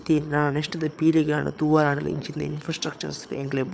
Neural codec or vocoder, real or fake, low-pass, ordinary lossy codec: codec, 16 kHz, 8 kbps, FreqCodec, larger model; fake; none; none